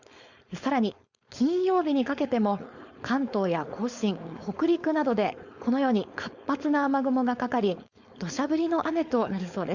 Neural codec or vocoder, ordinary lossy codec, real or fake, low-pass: codec, 16 kHz, 4.8 kbps, FACodec; Opus, 64 kbps; fake; 7.2 kHz